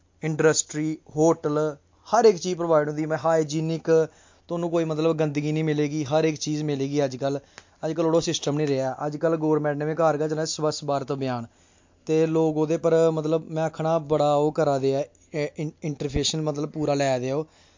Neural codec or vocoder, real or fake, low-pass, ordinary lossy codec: none; real; 7.2 kHz; MP3, 48 kbps